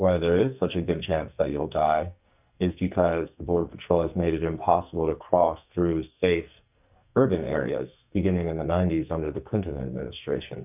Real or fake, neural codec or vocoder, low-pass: fake; codec, 44.1 kHz, 2.6 kbps, SNAC; 3.6 kHz